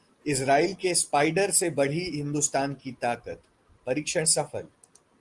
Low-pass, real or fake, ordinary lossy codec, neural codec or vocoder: 10.8 kHz; real; Opus, 24 kbps; none